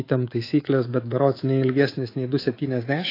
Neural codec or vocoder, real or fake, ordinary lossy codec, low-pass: codec, 24 kHz, 3.1 kbps, DualCodec; fake; AAC, 24 kbps; 5.4 kHz